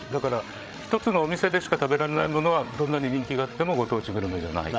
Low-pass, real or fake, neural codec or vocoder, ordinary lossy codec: none; fake; codec, 16 kHz, 16 kbps, FreqCodec, larger model; none